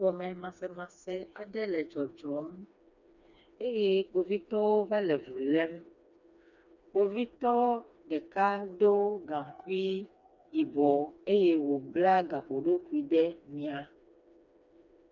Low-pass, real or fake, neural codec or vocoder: 7.2 kHz; fake; codec, 16 kHz, 2 kbps, FreqCodec, smaller model